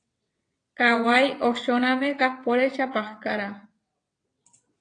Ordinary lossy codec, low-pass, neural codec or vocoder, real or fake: AAC, 48 kbps; 9.9 kHz; vocoder, 22.05 kHz, 80 mel bands, WaveNeXt; fake